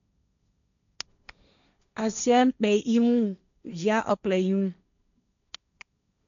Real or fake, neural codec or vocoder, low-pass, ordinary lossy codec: fake; codec, 16 kHz, 1.1 kbps, Voila-Tokenizer; 7.2 kHz; none